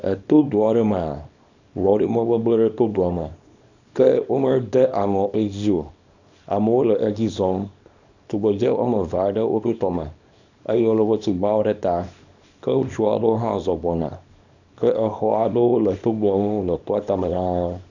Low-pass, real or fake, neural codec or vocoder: 7.2 kHz; fake; codec, 24 kHz, 0.9 kbps, WavTokenizer, small release